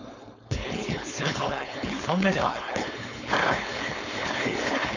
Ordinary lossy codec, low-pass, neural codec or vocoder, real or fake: none; 7.2 kHz; codec, 16 kHz, 4.8 kbps, FACodec; fake